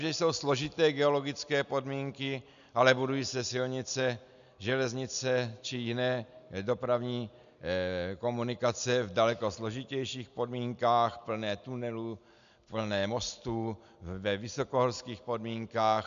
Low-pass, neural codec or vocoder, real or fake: 7.2 kHz; none; real